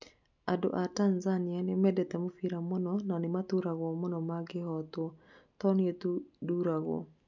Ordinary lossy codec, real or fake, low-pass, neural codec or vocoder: none; real; 7.2 kHz; none